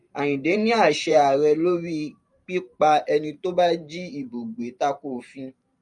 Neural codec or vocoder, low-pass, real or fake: vocoder, 44.1 kHz, 128 mel bands every 512 samples, BigVGAN v2; 10.8 kHz; fake